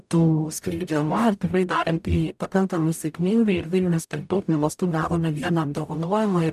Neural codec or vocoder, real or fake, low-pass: codec, 44.1 kHz, 0.9 kbps, DAC; fake; 14.4 kHz